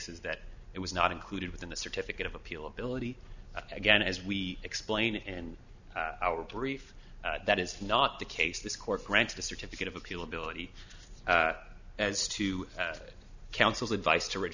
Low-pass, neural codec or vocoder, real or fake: 7.2 kHz; none; real